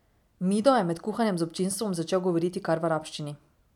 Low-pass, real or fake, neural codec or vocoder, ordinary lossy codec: 19.8 kHz; real; none; none